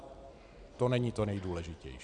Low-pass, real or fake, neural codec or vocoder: 9.9 kHz; real; none